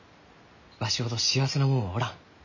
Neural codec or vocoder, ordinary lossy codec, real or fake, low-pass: none; none; real; 7.2 kHz